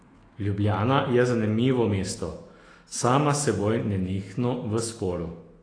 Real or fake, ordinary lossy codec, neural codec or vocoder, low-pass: fake; AAC, 32 kbps; autoencoder, 48 kHz, 128 numbers a frame, DAC-VAE, trained on Japanese speech; 9.9 kHz